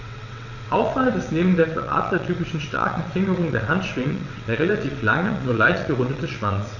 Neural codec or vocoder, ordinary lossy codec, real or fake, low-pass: vocoder, 22.05 kHz, 80 mel bands, WaveNeXt; none; fake; 7.2 kHz